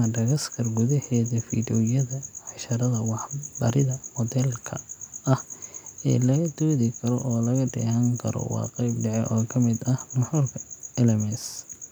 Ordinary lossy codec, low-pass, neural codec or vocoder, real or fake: none; none; none; real